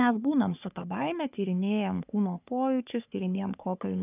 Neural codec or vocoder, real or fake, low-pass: codec, 44.1 kHz, 3.4 kbps, Pupu-Codec; fake; 3.6 kHz